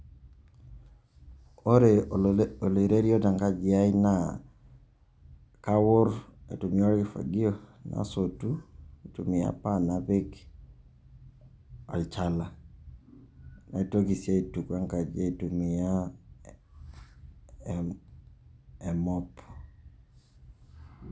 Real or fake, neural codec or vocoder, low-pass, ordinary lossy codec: real; none; none; none